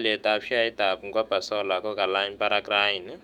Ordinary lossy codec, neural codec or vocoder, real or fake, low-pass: none; autoencoder, 48 kHz, 128 numbers a frame, DAC-VAE, trained on Japanese speech; fake; 19.8 kHz